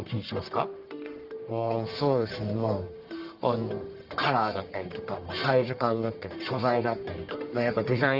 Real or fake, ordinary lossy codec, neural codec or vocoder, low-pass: fake; Opus, 32 kbps; codec, 44.1 kHz, 1.7 kbps, Pupu-Codec; 5.4 kHz